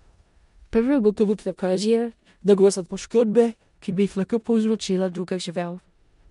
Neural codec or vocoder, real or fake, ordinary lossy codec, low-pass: codec, 16 kHz in and 24 kHz out, 0.4 kbps, LongCat-Audio-Codec, four codebook decoder; fake; MP3, 64 kbps; 10.8 kHz